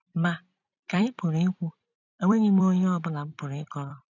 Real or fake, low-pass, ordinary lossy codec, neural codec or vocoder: fake; 7.2 kHz; none; vocoder, 44.1 kHz, 128 mel bands every 256 samples, BigVGAN v2